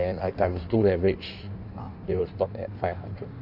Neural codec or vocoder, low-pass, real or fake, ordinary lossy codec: codec, 16 kHz in and 24 kHz out, 1.1 kbps, FireRedTTS-2 codec; 5.4 kHz; fake; none